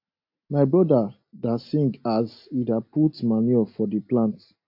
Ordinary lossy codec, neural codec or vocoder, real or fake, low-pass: MP3, 32 kbps; none; real; 5.4 kHz